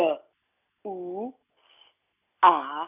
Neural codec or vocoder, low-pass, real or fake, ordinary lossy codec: none; 3.6 kHz; real; none